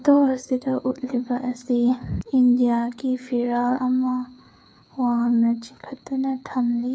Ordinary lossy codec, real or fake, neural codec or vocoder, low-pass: none; fake; codec, 16 kHz, 8 kbps, FreqCodec, smaller model; none